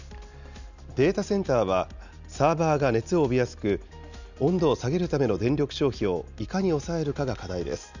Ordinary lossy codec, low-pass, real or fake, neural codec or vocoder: none; 7.2 kHz; real; none